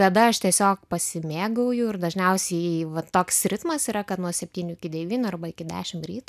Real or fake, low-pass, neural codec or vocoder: real; 14.4 kHz; none